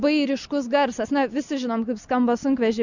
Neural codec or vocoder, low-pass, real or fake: none; 7.2 kHz; real